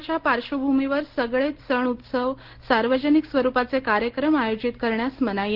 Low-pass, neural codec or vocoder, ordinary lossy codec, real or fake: 5.4 kHz; none; Opus, 16 kbps; real